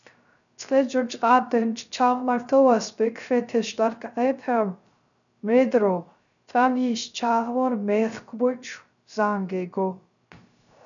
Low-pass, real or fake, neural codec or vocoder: 7.2 kHz; fake; codec, 16 kHz, 0.3 kbps, FocalCodec